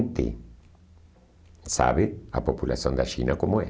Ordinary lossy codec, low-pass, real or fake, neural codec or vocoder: none; none; real; none